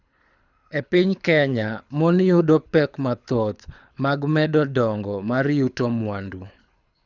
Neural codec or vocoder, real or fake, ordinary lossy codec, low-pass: codec, 24 kHz, 6 kbps, HILCodec; fake; none; 7.2 kHz